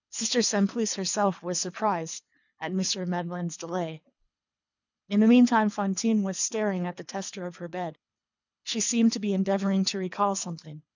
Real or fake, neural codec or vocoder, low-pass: fake; codec, 24 kHz, 3 kbps, HILCodec; 7.2 kHz